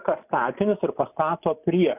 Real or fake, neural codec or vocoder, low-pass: real; none; 3.6 kHz